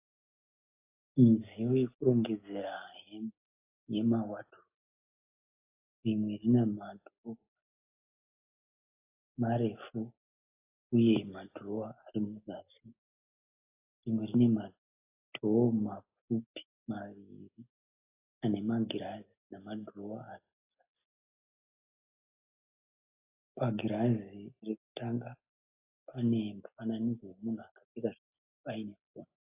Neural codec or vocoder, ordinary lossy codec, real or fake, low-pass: none; AAC, 24 kbps; real; 3.6 kHz